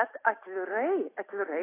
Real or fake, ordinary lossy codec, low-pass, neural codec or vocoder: real; AAC, 16 kbps; 3.6 kHz; none